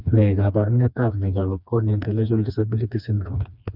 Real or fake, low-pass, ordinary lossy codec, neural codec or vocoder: fake; 5.4 kHz; none; codec, 16 kHz, 2 kbps, FreqCodec, smaller model